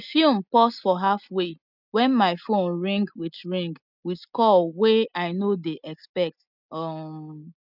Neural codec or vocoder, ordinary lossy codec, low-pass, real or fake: none; none; 5.4 kHz; real